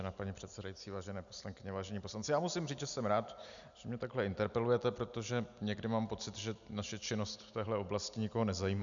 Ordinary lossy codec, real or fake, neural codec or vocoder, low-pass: MP3, 96 kbps; real; none; 7.2 kHz